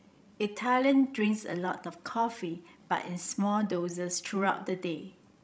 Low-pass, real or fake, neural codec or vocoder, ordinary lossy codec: none; fake; codec, 16 kHz, 16 kbps, FreqCodec, larger model; none